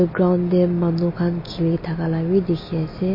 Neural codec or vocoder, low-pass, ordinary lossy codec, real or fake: none; 5.4 kHz; MP3, 24 kbps; real